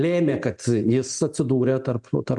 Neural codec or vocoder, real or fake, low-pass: none; real; 10.8 kHz